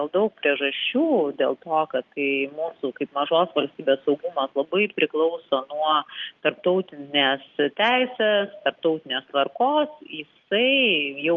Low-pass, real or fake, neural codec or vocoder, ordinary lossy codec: 7.2 kHz; real; none; Opus, 32 kbps